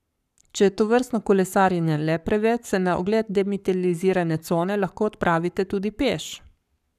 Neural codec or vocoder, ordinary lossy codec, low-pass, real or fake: codec, 44.1 kHz, 7.8 kbps, Pupu-Codec; none; 14.4 kHz; fake